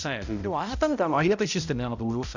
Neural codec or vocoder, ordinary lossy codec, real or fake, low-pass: codec, 16 kHz, 0.5 kbps, X-Codec, HuBERT features, trained on balanced general audio; none; fake; 7.2 kHz